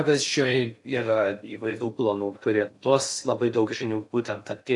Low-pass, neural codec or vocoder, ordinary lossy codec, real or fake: 10.8 kHz; codec, 16 kHz in and 24 kHz out, 0.6 kbps, FocalCodec, streaming, 4096 codes; AAC, 64 kbps; fake